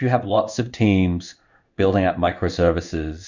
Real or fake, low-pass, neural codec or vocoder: fake; 7.2 kHz; codec, 16 kHz in and 24 kHz out, 1 kbps, XY-Tokenizer